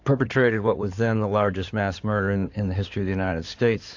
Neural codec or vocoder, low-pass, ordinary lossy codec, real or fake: codec, 16 kHz in and 24 kHz out, 2.2 kbps, FireRedTTS-2 codec; 7.2 kHz; AAC, 48 kbps; fake